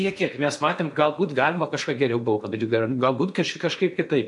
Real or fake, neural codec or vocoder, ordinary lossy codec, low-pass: fake; codec, 16 kHz in and 24 kHz out, 0.8 kbps, FocalCodec, streaming, 65536 codes; MP3, 64 kbps; 10.8 kHz